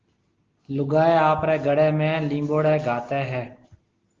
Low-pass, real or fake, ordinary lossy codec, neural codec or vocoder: 7.2 kHz; real; Opus, 16 kbps; none